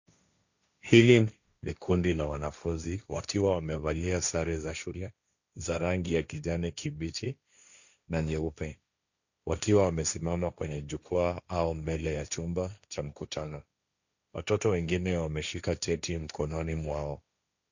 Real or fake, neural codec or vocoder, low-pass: fake; codec, 16 kHz, 1.1 kbps, Voila-Tokenizer; 7.2 kHz